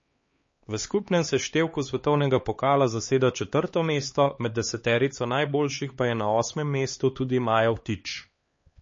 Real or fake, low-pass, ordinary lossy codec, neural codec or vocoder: fake; 7.2 kHz; MP3, 32 kbps; codec, 16 kHz, 4 kbps, X-Codec, HuBERT features, trained on LibriSpeech